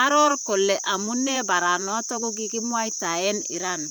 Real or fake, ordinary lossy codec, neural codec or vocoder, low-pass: fake; none; vocoder, 44.1 kHz, 128 mel bands, Pupu-Vocoder; none